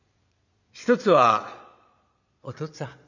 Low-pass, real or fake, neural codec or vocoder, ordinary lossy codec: 7.2 kHz; fake; vocoder, 22.05 kHz, 80 mel bands, Vocos; none